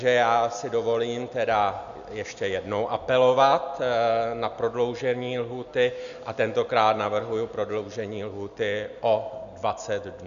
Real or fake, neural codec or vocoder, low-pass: real; none; 7.2 kHz